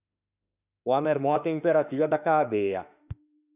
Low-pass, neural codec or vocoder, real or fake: 3.6 kHz; autoencoder, 48 kHz, 32 numbers a frame, DAC-VAE, trained on Japanese speech; fake